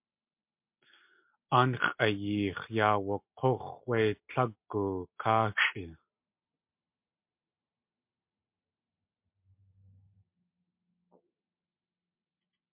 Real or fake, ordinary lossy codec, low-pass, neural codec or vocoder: real; MP3, 32 kbps; 3.6 kHz; none